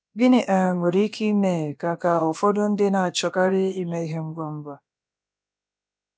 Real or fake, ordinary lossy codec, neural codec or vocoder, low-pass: fake; none; codec, 16 kHz, about 1 kbps, DyCAST, with the encoder's durations; none